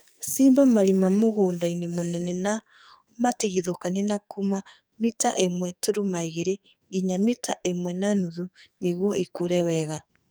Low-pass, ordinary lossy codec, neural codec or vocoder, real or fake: none; none; codec, 44.1 kHz, 2.6 kbps, SNAC; fake